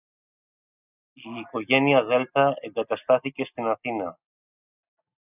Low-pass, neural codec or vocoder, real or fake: 3.6 kHz; none; real